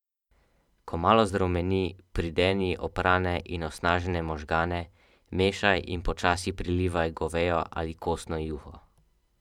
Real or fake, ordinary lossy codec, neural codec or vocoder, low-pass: fake; none; vocoder, 44.1 kHz, 128 mel bands every 512 samples, BigVGAN v2; 19.8 kHz